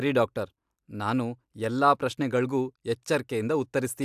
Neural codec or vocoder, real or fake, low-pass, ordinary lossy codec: none; real; 14.4 kHz; AAC, 96 kbps